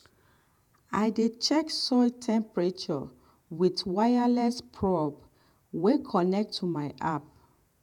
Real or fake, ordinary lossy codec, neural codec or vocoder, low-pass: fake; none; vocoder, 48 kHz, 128 mel bands, Vocos; 19.8 kHz